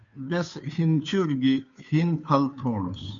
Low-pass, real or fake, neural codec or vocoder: 7.2 kHz; fake; codec, 16 kHz, 2 kbps, FunCodec, trained on Chinese and English, 25 frames a second